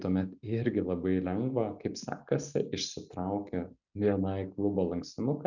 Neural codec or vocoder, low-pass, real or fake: none; 7.2 kHz; real